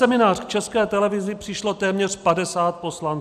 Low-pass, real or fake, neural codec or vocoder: 14.4 kHz; real; none